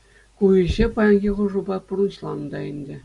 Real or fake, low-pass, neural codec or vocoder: real; 10.8 kHz; none